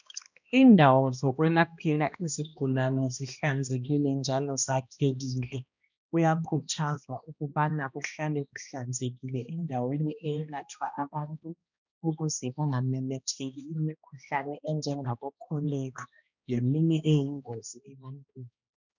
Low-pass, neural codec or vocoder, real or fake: 7.2 kHz; codec, 16 kHz, 1 kbps, X-Codec, HuBERT features, trained on balanced general audio; fake